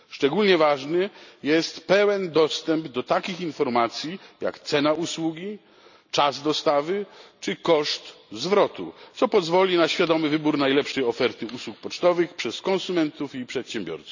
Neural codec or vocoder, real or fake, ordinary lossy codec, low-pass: none; real; none; 7.2 kHz